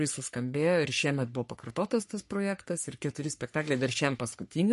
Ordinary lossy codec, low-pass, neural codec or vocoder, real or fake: MP3, 48 kbps; 14.4 kHz; codec, 44.1 kHz, 3.4 kbps, Pupu-Codec; fake